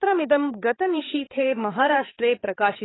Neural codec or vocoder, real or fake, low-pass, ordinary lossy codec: codec, 16 kHz, 4 kbps, X-Codec, HuBERT features, trained on balanced general audio; fake; 7.2 kHz; AAC, 16 kbps